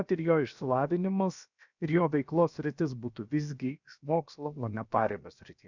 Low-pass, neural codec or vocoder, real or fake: 7.2 kHz; codec, 16 kHz, about 1 kbps, DyCAST, with the encoder's durations; fake